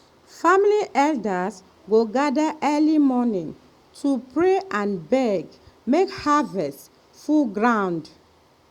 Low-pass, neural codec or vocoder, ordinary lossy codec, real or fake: 19.8 kHz; none; Opus, 64 kbps; real